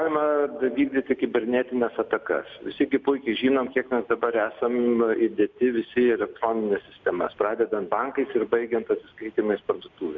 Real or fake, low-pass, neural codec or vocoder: real; 7.2 kHz; none